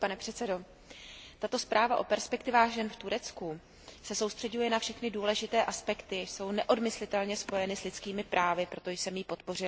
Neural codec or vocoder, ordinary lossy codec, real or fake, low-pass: none; none; real; none